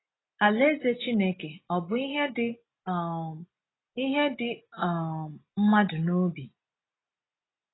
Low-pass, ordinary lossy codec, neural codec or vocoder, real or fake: 7.2 kHz; AAC, 16 kbps; none; real